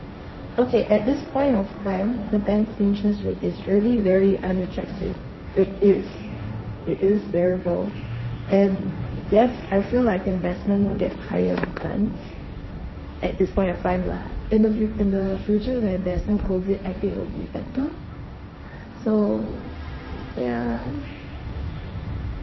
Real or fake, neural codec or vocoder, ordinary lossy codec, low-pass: fake; codec, 16 kHz, 1.1 kbps, Voila-Tokenizer; MP3, 24 kbps; 7.2 kHz